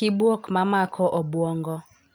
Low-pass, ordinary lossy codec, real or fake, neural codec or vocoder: none; none; real; none